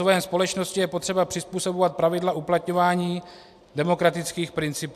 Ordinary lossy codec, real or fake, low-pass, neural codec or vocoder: AAC, 96 kbps; real; 14.4 kHz; none